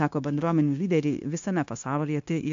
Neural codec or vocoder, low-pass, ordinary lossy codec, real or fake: codec, 16 kHz, 0.9 kbps, LongCat-Audio-Codec; 7.2 kHz; AAC, 64 kbps; fake